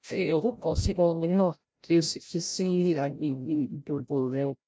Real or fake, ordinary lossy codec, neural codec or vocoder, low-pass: fake; none; codec, 16 kHz, 0.5 kbps, FreqCodec, larger model; none